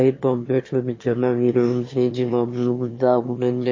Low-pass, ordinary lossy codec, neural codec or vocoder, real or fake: 7.2 kHz; MP3, 32 kbps; autoencoder, 22.05 kHz, a latent of 192 numbers a frame, VITS, trained on one speaker; fake